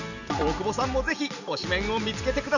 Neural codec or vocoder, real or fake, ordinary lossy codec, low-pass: none; real; none; 7.2 kHz